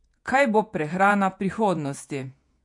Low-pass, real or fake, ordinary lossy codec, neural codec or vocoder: 10.8 kHz; fake; MP3, 64 kbps; vocoder, 48 kHz, 128 mel bands, Vocos